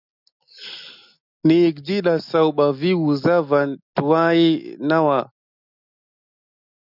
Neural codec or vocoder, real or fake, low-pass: none; real; 5.4 kHz